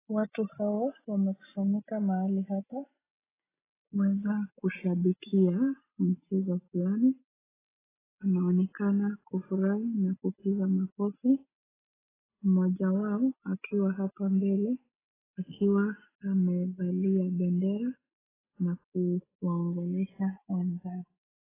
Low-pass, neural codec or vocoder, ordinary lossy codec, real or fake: 3.6 kHz; none; AAC, 16 kbps; real